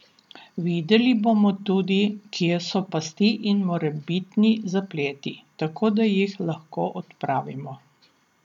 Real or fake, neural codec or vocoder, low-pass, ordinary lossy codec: real; none; 19.8 kHz; none